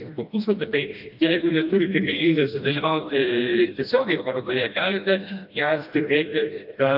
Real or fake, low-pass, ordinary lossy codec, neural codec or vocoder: fake; 5.4 kHz; AAC, 48 kbps; codec, 16 kHz, 1 kbps, FreqCodec, smaller model